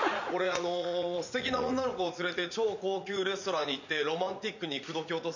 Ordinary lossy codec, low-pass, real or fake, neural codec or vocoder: none; 7.2 kHz; fake; vocoder, 44.1 kHz, 80 mel bands, Vocos